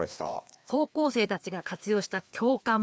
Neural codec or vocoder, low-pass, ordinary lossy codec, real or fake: codec, 16 kHz, 2 kbps, FreqCodec, larger model; none; none; fake